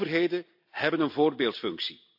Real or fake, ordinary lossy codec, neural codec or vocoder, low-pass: real; AAC, 48 kbps; none; 5.4 kHz